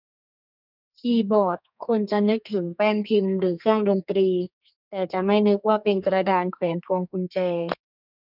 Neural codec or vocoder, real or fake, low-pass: codec, 32 kHz, 1.9 kbps, SNAC; fake; 5.4 kHz